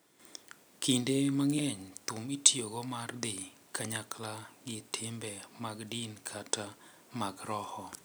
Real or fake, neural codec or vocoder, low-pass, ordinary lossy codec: real; none; none; none